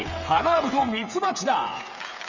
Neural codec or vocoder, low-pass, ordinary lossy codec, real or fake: codec, 16 kHz, 8 kbps, FreqCodec, smaller model; 7.2 kHz; none; fake